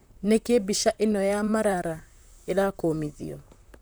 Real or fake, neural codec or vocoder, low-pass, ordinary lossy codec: fake; vocoder, 44.1 kHz, 128 mel bands, Pupu-Vocoder; none; none